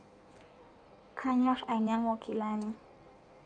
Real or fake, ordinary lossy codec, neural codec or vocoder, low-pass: fake; none; codec, 16 kHz in and 24 kHz out, 1.1 kbps, FireRedTTS-2 codec; 9.9 kHz